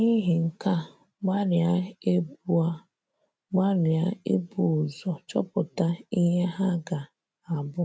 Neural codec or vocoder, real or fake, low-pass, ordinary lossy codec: none; real; none; none